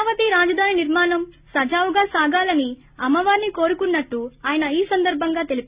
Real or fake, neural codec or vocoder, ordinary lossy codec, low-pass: real; none; Opus, 64 kbps; 3.6 kHz